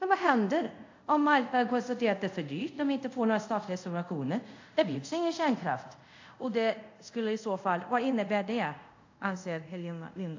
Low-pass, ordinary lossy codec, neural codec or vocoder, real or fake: 7.2 kHz; AAC, 48 kbps; codec, 24 kHz, 0.5 kbps, DualCodec; fake